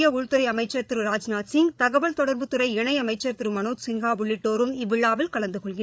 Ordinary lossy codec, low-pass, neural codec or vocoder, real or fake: none; none; codec, 16 kHz, 8 kbps, FreqCodec, larger model; fake